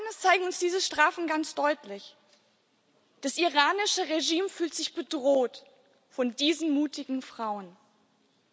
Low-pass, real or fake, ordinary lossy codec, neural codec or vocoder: none; real; none; none